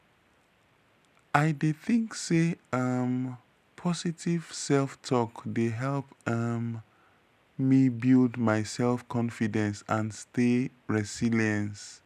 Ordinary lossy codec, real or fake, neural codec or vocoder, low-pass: none; real; none; 14.4 kHz